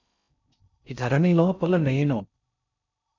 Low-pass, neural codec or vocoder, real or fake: 7.2 kHz; codec, 16 kHz in and 24 kHz out, 0.6 kbps, FocalCodec, streaming, 4096 codes; fake